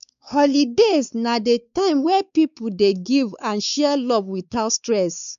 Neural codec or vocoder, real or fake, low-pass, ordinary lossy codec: codec, 16 kHz, 4 kbps, X-Codec, WavLM features, trained on Multilingual LibriSpeech; fake; 7.2 kHz; none